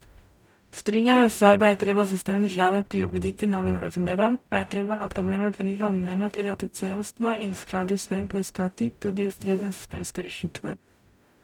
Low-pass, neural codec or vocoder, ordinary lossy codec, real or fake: 19.8 kHz; codec, 44.1 kHz, 0.9 kbps, DAC; none; fake